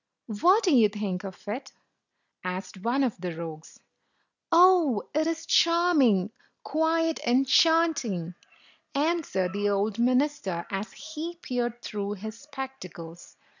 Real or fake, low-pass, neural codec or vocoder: real; 7.2 kHz; none